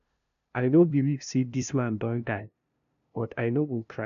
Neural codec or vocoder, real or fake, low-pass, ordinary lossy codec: codec, 16 kHz, 0.5 kbps, FunCodec, trained on LibriTTS, 25 frames a second; fake; 7.2 kHz; none